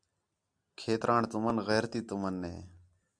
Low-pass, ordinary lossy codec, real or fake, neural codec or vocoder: 9.9 kHz; Opus, 64 kbps; real; none